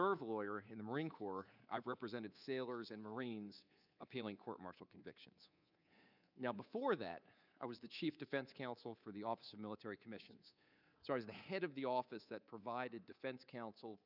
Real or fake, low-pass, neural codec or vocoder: fake; 5.4 kHz; codec, 24 kHz, 3.1 kbps, DualCodec